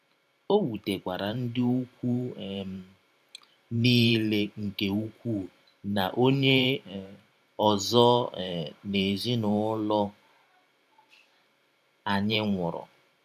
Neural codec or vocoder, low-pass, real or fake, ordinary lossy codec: vocoder, 44.1 kHz, 128 mel bands every 512 samples, BigVGAN v2; 14.4 kHz; fake; none